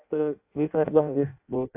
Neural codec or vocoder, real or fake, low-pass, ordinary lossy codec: codec, 16 kHz in and 24 kHz out, 0.6 kbps, FireRedTTS-2 codec; fake; 3.6 kHz; AAC, 24 kbps